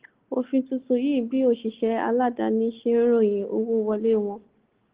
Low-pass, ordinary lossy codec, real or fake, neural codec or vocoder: 3.6 kHz; Opus, 16 kbps; real; none